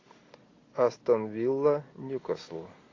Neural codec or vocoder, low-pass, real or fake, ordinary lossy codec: none; 7.2 kHz; real; AAC, 32 kbps